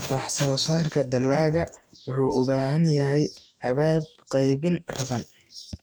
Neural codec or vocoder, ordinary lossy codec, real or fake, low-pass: codec, 44.1 kHz, 2.6 kbps, DAC; none; fake; none